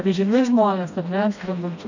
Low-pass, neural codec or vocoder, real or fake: 7.2 kHz; codec, 16 kHz, 1 kbps, FreqCodec, smaller model; fake